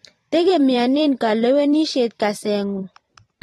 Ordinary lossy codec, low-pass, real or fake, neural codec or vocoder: AAC, 32 kbps; 19.8 kHz; fake; vocoder, 44.1 kHz, 128 mel bands every 512 samples, BigVGAN v2